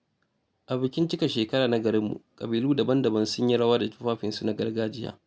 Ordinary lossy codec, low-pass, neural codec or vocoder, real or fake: none; none; none; real